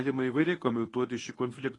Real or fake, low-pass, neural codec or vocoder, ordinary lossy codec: fake; 10.8 kHz; codec, 24 kHz, 0.9 kbps, WavTokenizer, medium speech release version 1; AAC, 32 kbps